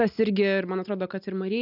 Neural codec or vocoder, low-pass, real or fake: none; 5.4 kHz; real